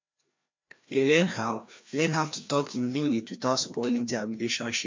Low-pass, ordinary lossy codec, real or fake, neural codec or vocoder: 7.2 kHz; MP3, 48 kbps; fake; codec, 16 kHz, 1 kbps, FreqCodec, larger model